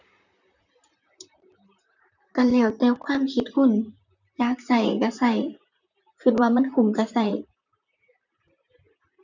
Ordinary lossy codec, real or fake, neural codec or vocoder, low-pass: none; fake; vocoder, 44.1 kHz, 128 mel bands, Pupu-Vocoder; 7.2 kHz